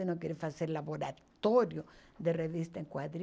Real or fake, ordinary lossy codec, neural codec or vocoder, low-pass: real; none; none; none